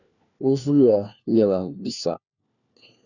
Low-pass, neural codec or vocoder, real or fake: 7.2 kHz; codec, 16 kHz, 1 kbps, FunCodec, trained on LibriTTS, 50 frames a second; fake